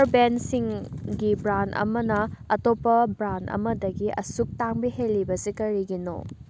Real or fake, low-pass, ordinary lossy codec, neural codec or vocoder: real; none; none; none